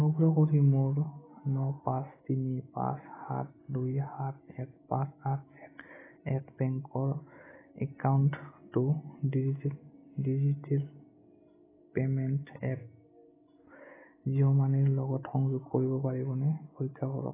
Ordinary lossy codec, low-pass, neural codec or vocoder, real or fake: AAC, 16 kbps; 3.6 kHz; none; real